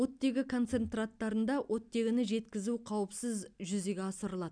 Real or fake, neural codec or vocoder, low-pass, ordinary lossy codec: real; none; 9.9 kHz; none